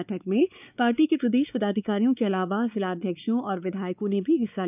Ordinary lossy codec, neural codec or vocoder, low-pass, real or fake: none; codec, 16 kHz, 4 kbps, X-Codec, WavLM features, trained on Multilingual LibriSpeech; 3.6 kHz; fake